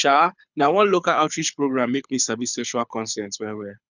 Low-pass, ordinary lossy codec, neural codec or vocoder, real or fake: 7.2 kHz; none; codec, 24 kHz, 6 kbps, HILCodec; fake